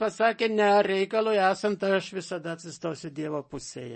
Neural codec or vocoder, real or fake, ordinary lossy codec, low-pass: none; real; MP3, 32 kbps; 10.8 kHz